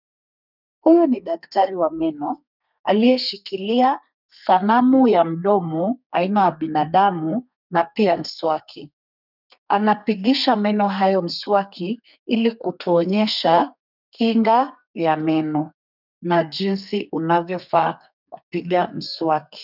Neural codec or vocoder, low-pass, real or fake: codec, 32 kHz, 1.9 kbps, SNAC; 5.4 kHz; fake